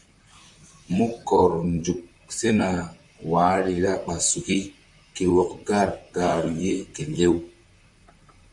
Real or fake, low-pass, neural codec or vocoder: fake; 10.8 kHz; vocoder, 44.1 kHz, 128 mel bands, Pupu-Vocoder